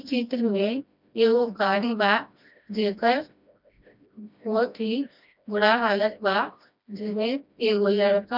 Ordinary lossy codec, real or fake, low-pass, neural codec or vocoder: none; fake; 5.4 kHz; codec, 16 kHz, 1 kbps, FreqCodec, smaller model